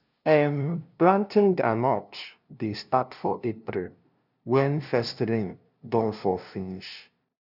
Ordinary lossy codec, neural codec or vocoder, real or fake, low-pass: none; codec, 16 kHz, 0.5 kbps, FunCodec, trained on LibriTTS, 25 frames a second; fake; 5.4 kHz